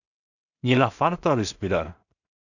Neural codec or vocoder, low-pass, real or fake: codec, 16 kHz in and 24 kHz out, 0.4 kbps, LongCat-Audio-Codec, two codebook decoder; 7.2 kHz; fake